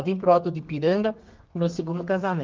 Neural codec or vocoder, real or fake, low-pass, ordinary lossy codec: codec, 32 kHz, 1.9 kbps, SNAC; fake; 7.2 kHz; Opus, 24 kbps